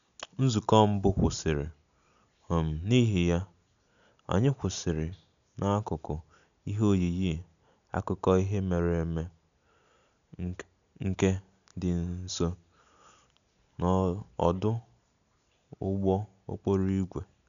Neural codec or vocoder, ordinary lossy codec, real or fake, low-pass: none; none; real; 7.2 kHz